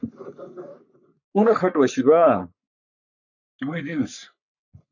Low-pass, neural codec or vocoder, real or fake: 7.2 kHz; codec, 44.1 kHz, 3.4 kbps, Pupu-Codec; fake